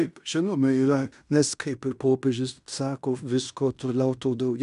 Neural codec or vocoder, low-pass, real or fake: codec, 16 kHz in and 24 kHz out, 0.9 kbps, LongCat-Audio-Codec, fine tuned four codebook decoder; 10.8 kHz; fake